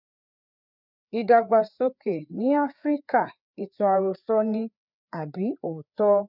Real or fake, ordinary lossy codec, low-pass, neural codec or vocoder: fake; none; 5.4 kHz; codec, 16 kHz, 4 kbps, FreqCodec, larger model